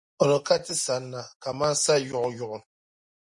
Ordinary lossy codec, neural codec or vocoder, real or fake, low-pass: MP3, 48 kbps; none; real; 10.8 kHz